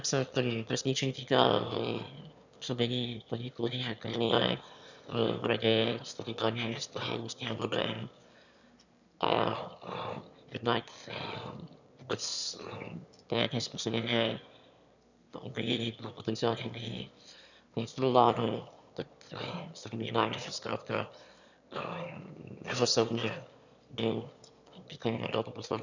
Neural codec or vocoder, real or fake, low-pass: autoencoder, 22.05 kHz, a latent of 192 numbers a frame, VITS, trained on one speaker; fake; 7.2 kHz